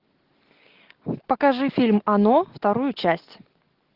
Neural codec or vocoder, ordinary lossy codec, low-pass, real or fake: none; Opus, 16 kbps; 5.4 kHz; real